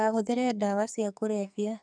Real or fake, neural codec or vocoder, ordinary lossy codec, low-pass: fake; codec, 44.1 kHz, 2.6 kbps, SNAC; none; 9.9 kHz